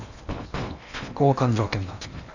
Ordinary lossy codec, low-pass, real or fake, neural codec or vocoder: none; 7.2 kHz; fake; codec, 16 kHz in and 24 kHz out, 0.8 kbps, FocalCodec, streaming, 65536 codes